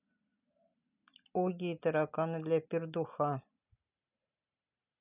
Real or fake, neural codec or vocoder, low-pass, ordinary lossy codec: real; none; 3.6 kHz; none